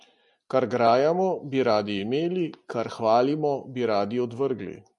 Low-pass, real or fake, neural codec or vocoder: 10.8 kHz; real; none